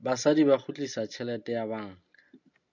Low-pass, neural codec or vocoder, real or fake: 7.2 kHz; none; real